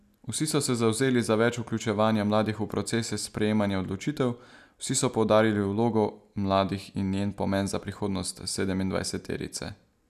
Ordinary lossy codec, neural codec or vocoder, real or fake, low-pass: none; none; real; 14.4 kHz